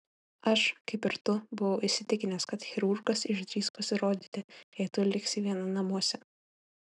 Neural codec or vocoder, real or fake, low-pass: none; real; 10.8 kHz